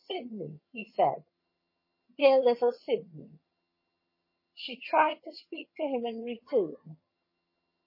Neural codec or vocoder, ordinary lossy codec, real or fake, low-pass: vocoder, 22.05 kHz, 80 mel bands, HiFi-GAN; MP3, 24 kbps; fake; 5.4 kHz